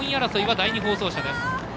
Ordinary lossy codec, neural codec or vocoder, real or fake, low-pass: none; none; real; none